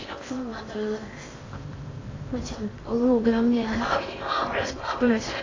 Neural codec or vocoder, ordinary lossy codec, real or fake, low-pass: codec, 16 kHz in and 24 kHz out, 0.6 kbps, FocalCodec, streaming, 4096 codes; AAC, 32 kbps; fake; 7.2 kHz